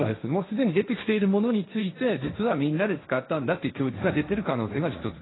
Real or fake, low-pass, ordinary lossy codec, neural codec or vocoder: fake; 7.2 kHz; AAC, 16 kbps; codec, 16 kHz, 1.1 kbps, Voila-Tokenizer